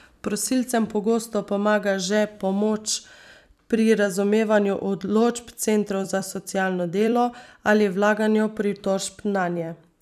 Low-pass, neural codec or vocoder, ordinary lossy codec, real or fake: 14.4 kHz; vocoder, 44.1 kHz, 128 mel bands every 256 samples, BigVGAN v2; none; fake